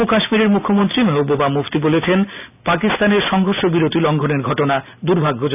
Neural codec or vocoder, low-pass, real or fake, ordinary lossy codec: none; 3.6 kHz; real; none